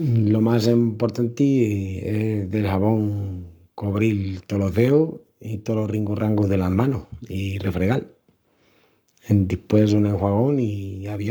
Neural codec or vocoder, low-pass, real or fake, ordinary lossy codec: codec, 44.1 kHz, 7.8 kbps, Pupu-Codec; none; fake; none